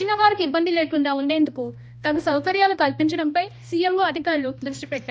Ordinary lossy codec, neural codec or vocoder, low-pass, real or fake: none; codec, 16 kHz, 1 kbps, X-Codec, HuBERT features, trained on balanced general audio; none; fake